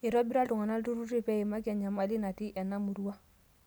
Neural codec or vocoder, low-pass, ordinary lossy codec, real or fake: none; none; none; real